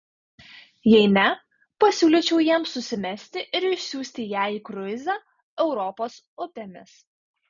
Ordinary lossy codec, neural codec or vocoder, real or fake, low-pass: MP3, 96 kbps; none; real; 7.2 kHz